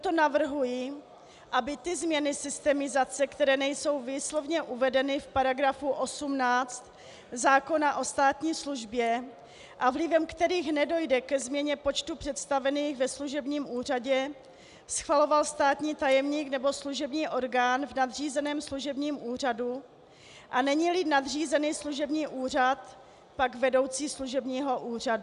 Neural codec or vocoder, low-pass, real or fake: none; 10.8 kHz; real